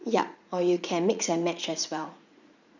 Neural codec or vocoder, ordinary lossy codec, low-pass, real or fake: vocoder, 44.1 kHz, 80 mel bands, Vocos; none; 7.2 kHz; fake